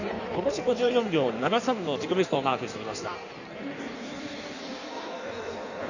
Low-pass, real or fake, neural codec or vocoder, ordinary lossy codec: 7.2 kHz; fake; codec, 16 kHz in and 24 kHz out, 1.1 kbps, FireRedTTS-2 codec; none